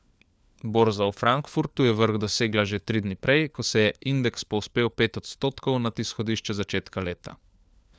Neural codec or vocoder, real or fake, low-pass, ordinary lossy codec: codec, 16 kHz, 4 kbps, FunCodec, trained on LibriTTS, 50 frames a second; fake; none; none